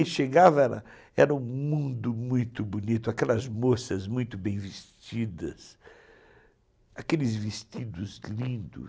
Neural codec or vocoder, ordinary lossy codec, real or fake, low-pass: none; none; real; none